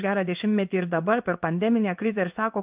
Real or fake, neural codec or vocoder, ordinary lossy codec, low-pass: fake; codec, 16 kHz in and 24 kHz out, 1 kbps, XY-Tokenizer; Opus, 32 kbps; 3.6 kHz